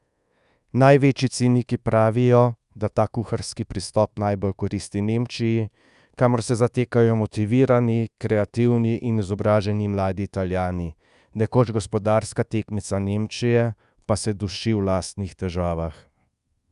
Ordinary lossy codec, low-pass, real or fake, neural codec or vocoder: Opus, 64 kbps; 10.8 kHz; fake; codec, 24 kHz, 1.2 kbps, DualCodec